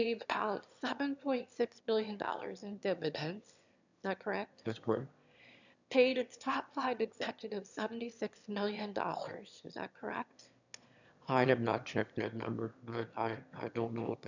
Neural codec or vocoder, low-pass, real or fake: autoencoder, 22.05 kHz, a latent of 192 numbers a frame, VITS, trained on one speaker; 7.2 kHz; fake